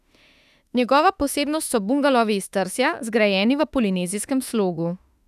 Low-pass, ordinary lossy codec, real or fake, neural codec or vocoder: 14.4 kHz; none; fake; autoencoder, 48 kHz, 32 numbers a frame, DAC-VAE, trained on Japanese speech